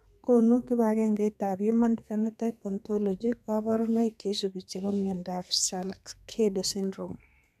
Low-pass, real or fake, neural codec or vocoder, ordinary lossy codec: 14.4 kHz; fake; codec, 32 kHz, 1.9 kbps, SNAC; none